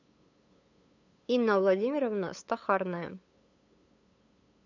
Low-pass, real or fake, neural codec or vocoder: 7.2 kHz; fake; codec, 16 kHz, 8 kbps, FunCodec, trained on LibriTTS, 25 frames a second